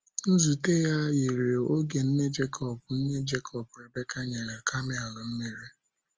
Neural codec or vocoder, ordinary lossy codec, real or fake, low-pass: none; Opus, 24 kbps; real; 7.2 kHz